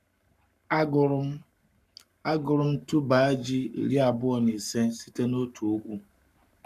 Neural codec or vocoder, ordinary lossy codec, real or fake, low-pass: codec, 44.1 kHz, 7.8 kbps, Pupu-Codec; none; fake; 14.4 kHz